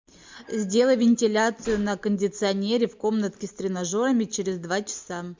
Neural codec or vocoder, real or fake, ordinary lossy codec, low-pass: none; real; MP3, 64 kbps; 7.2 kHz